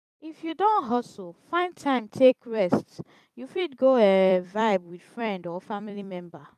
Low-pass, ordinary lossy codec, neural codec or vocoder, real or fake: 14.4 kHz; none; vocoder, 44.1 kHz, 128 mel bands every 256 samples, BigVGAN v2; fake